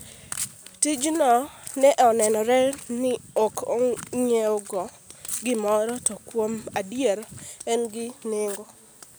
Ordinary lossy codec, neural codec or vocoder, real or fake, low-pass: none; none; real; none